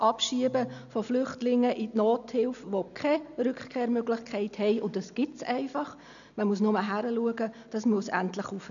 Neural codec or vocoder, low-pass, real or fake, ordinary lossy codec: none; 7.2 kHz; real; none